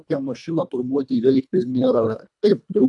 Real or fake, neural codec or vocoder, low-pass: fake; codec, 24 kHz, 1.5 kbps, HILCodec; 10.8 kHz